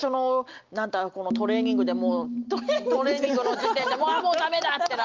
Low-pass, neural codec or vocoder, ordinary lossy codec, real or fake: 7.2 kHz; none; Opus, 24 kbps; real